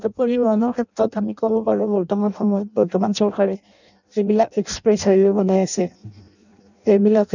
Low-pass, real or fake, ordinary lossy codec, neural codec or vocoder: 7.2 kHz; fake; none; codec, 16 kHz in and 24 kHz out, 0.6 kbps, FireRedTTS-2 codec